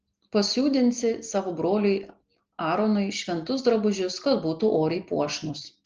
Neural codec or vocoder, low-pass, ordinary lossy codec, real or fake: none; 7.2 kHz; Opus, 16 kbps; real